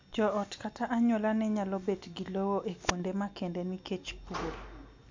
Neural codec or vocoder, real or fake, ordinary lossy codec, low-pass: none; real; MP3, 64 kbps; 7.2 kHz